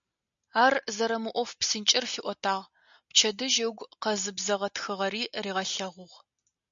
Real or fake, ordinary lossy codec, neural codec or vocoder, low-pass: real; MP3, 64 kbps; none; 7.2 kHz